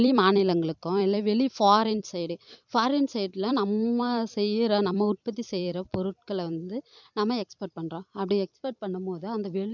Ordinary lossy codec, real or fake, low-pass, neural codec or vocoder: none; fake; 7.2 kHz; vocoder, 44.1 kHz, 128 mel bands every 256 samples, BigVGAN v2